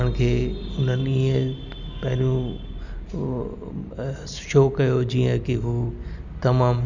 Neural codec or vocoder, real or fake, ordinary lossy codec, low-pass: none; real; none; 7.2 kHz